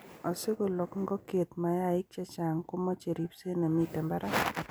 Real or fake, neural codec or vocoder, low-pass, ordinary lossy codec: real; none; none; none